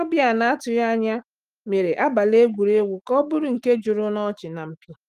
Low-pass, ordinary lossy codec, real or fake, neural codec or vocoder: 14.4 kHz; Opus, 24 kbps; fake; autoencoder, 48 kHz, 128 numbers a frame, DAC-VAE, trained on Japanese speech